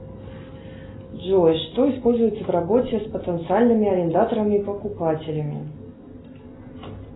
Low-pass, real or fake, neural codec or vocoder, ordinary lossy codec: 7.2 kHz; real; none; AAC, 16 kbps